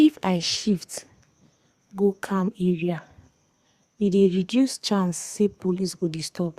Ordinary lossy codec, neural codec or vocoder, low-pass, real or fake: Opus, 64 kbps; codec, 32 kHz, 1.9 kbps, SNAC; 14.4 kHz; fake